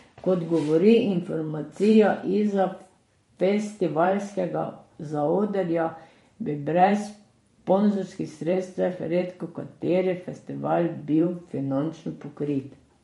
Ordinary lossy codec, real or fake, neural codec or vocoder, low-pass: MP3, 48 kbps; fake; vocoder, 44.1 kHz, 128 mel bands every 512 samples, BigVGAN v2; 19.8 kHz